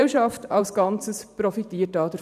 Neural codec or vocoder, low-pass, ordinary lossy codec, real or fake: vocoder, 44.1 kHz, 128 mel bands every 512 samples, BigVGAN v2; 14.4 kHz; none; fake